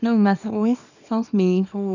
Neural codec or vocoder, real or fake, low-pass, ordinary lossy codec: codec, 24 kHz, 0.9 kbps, WavTokenizer, small release; fake; 7.2 kHz; none